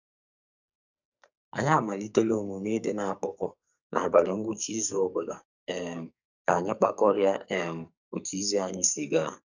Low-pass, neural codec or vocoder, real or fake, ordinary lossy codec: 7.2 kHz; codec, 44.1 kHz, 2.6 kbps, SNAC; fake; none